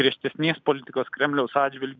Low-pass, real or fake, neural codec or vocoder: 7.2 kHz; real; none